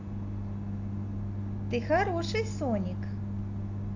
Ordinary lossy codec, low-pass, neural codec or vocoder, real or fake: AAC, 48 kbps; 7.2 kHz; none; real